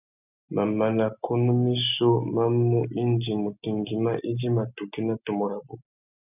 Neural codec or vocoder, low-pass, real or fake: none; 3.6 kHz; real